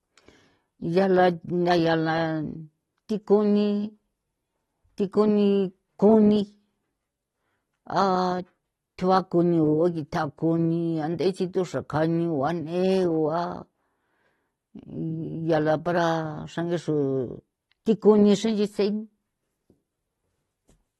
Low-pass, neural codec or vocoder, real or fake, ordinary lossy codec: 19.8 kHz; none; real; AAC, 32 kbps